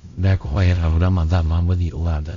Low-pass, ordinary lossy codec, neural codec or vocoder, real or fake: 7.2 kHz; MP3, 64 kbps; codec, 16 kHz, 0.5 kbps, X-Codec, WavLM features, trained on Multilingual LibriSpeech; fake